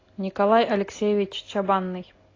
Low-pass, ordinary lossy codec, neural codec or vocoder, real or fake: 7.2 kHz; AAC, 32 kbps; none; real